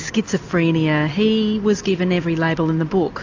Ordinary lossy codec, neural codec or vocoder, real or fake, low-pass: AAC, 48 kbps; none; real; 7.2 kHz